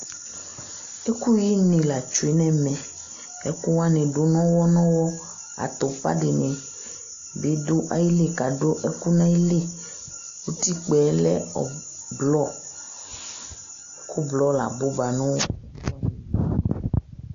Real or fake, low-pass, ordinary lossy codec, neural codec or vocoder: real; 7.2 kHz; AAC, 48 kbps; none